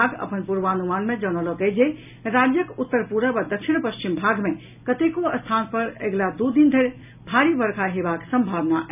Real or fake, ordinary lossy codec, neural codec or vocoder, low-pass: real; none; none; 3.6 kHz